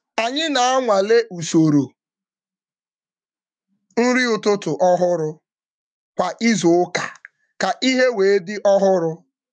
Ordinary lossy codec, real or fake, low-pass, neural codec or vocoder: MP3, 96 kbps; fake; 9.9 kHz; autoencoder, 48 kHz, 128 numbers a frame, DAC-VAE, trained on Japanese speech